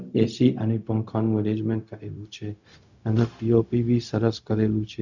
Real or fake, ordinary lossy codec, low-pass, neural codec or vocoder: fake; none; 7.2 kHz; codec, 16 kHz, 0.4 kbps, LongCat-Audio-Codec